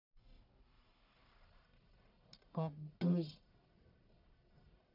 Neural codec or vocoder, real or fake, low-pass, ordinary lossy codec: codec, 44.1 kHz, 1.7 kbps, Pupu-Codec; fake; 5.4 kHz; none